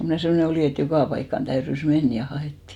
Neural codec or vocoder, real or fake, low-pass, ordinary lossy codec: none; real; 19.8 kHz; none